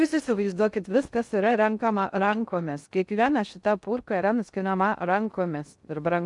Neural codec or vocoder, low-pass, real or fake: codec, 16 kHz in and 24 kHz out, 0.6 kbps, FocalCodec, streaming, 2048 codes; 10.8 kHz; fake